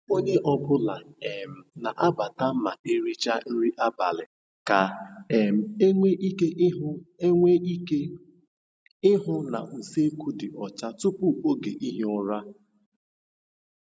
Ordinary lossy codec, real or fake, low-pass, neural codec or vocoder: none; real; none; none